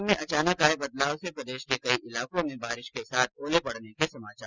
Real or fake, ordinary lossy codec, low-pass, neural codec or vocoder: real; Opus, 32 kbps; 7.2 kHz; none